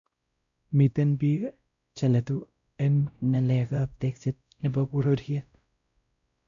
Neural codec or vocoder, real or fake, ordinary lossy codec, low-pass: codec, 16 kHz, 0.5 kbps, X-Codec, WavLM features, trained on Multilingual LibriSpeech; fake; MP3, 64 kbps; 7.2 kHz